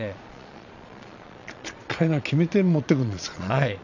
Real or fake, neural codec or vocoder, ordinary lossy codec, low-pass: fake; vocoder, 22.05 kHz, 80 mel bands, Vocos; none; 7.2 kHz